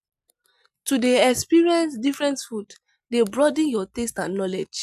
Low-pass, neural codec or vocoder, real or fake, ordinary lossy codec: 14.4 kHz; none; real; none